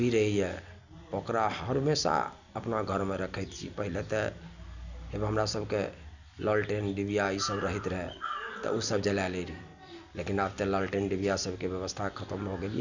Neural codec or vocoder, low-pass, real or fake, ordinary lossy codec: none; 7.2 kHz; real; none